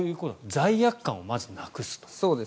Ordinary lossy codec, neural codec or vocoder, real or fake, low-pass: none; none; real; none